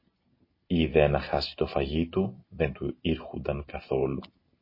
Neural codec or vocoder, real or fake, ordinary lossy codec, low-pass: none; real; MP3, 24 kbps; 5.4 kHz